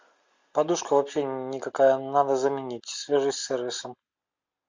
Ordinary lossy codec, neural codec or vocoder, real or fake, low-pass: MP3, 64 kbps; none; real; 7.2 kHz